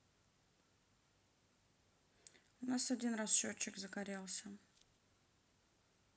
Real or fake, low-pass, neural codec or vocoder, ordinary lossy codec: real; none; none; none